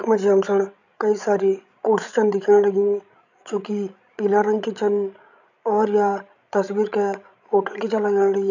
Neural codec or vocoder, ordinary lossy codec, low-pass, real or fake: codec, 16 kHz, 16 kbps, FreqCodec, larger model; none; 7.2 kHz; fake